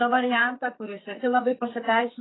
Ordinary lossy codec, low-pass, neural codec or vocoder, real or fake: AAC, 16 kbps; 7.2 kHz; codec, 32 kHz, 1.9 kbps, SNAC; fake